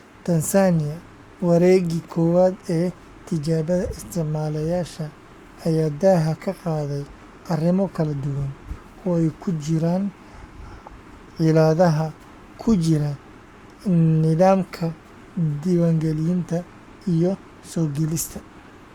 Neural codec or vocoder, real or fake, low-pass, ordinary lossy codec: codec, 44.1 kHz, 7.8 kbps, Pupu-Codec; fake; 19.8 kHz; Opus, 64 kbps